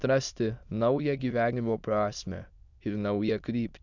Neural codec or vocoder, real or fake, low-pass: autoencoder, 22.05 kHz, a latent of 192 numbers a frame, VITS, trained on many speakers; fake; 7.2 kHz